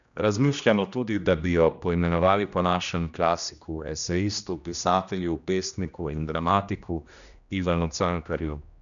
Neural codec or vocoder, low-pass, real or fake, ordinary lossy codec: codec, 16 kHz, 1 kbps, X-Codec, HuBERT features, trained on general audio; 7.2 kHz; fake; none